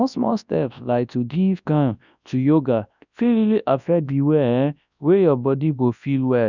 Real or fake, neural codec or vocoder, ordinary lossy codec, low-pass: fake; codec, 24 kHz, 0.9 kbps, WavTokenizer, large speech release; none; 7.2 kHz